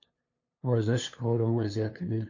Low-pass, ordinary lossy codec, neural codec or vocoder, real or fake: 7.2 kHz; AAC, 48 kbps; codec, 16 kHz, 2 kbps, FunCodec, trained on LibriTTS, 25 frames a second; fake